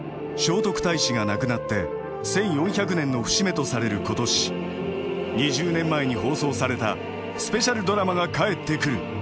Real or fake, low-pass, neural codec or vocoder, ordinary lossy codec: real; none; none; none